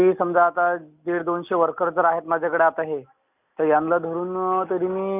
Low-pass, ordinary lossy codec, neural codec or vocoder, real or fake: 3.6 kHz; none; none; real